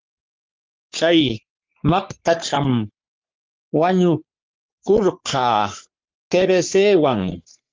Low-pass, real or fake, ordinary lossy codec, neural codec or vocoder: 7.2 kHz; fake; Opus, 32 kbps; codec, 44.1 kHz, 3.4 kbps, Pupu-Codec